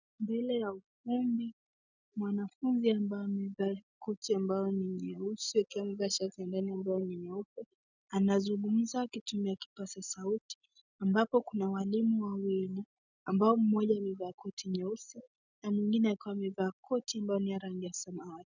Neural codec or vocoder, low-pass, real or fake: none; 7.2 kHz; real